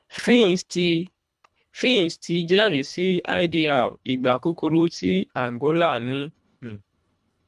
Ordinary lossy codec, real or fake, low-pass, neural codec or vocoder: none; fake; none; codec, 24 kHz, 1.5 kbps, HILCodec